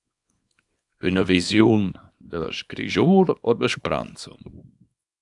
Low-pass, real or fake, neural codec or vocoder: 10.8 kHz; fake; codec, 24 kHz, 0.9 kbps, WavTokenizer, small release